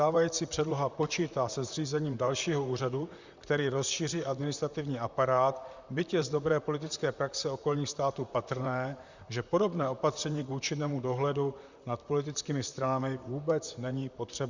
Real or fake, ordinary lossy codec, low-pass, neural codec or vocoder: fake; Opus, 64 kbps; 7.2 kHz; vocoder, 44.1 kHz, 128 mel bands, Pupu-Vocoder